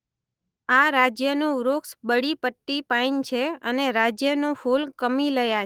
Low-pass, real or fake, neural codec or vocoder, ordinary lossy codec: 19.8 kHz; fake; autoencoder, 48 kHz, 128 numbers a frame, DAC-VAE, trained on Japanese speech; Opus, 24 kbps